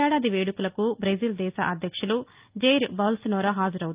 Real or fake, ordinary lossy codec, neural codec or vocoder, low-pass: real; Opus, 24 kbps; none; 3.6 kHz